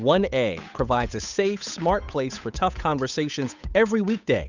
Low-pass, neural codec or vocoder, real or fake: 7.2 kHz; codec, 16 kHz, 8 kbps, FunCodec, trained on Chinese and English, 25 frames a second; fake